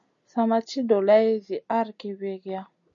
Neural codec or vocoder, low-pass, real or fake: none; 7.2 kHz; real